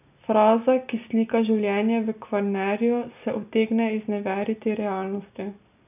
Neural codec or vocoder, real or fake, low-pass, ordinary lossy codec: none; real; 3.6 kHz; none